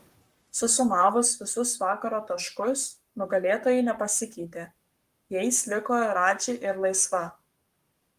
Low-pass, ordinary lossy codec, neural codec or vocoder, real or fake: 14.4 kHz; Opus, 32 kbps; codec, 44.1 kHz, 7.8 kbps, Pupu-Codec; fake